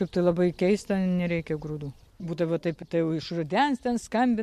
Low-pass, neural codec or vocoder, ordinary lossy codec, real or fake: 14.4 kHz; none; MP3, 64 kbps; real